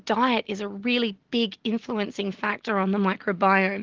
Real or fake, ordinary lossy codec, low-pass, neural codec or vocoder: real; Opus, 16 kbps; 7.2 kHz; none